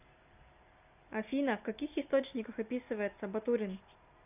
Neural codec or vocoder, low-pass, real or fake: none; 3.6 kHz; real